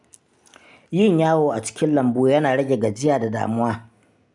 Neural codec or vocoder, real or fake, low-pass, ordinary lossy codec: none; real; 10.8 kHz; none